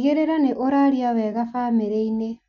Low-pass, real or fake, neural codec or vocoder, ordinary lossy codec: 7.2 kHz; real; none; MP3, 64 kbps